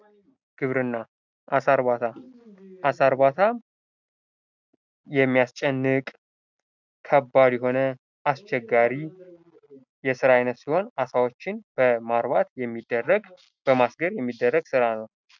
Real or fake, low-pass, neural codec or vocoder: real; 7.2 kHz; none